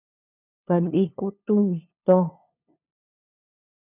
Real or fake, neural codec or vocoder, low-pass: fake; codec, 16 kHz, 2 kbps, FunCodec, trained on LibriTTS, 25 frames a second; 3.6 kHz